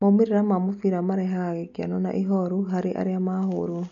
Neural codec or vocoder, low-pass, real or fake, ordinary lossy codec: none; 7.2 kHz; real; none